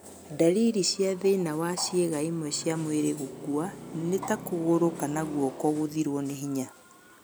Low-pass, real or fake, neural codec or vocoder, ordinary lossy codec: none; real; none; none